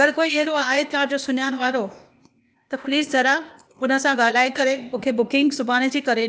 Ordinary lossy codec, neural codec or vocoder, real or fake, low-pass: none; codec, 16 kHz, 0.8 kbps, ZipCodec; fake; none